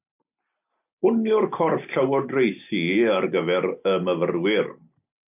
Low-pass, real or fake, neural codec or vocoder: 3.6 kHz; real; none